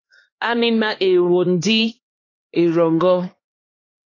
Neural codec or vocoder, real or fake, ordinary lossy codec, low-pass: codec, 16 kHz, 2 kbps, X-Codec, HuBERT features, trained on LibriSpeech; fake; AAC, 32 kbps; 7.2 kHz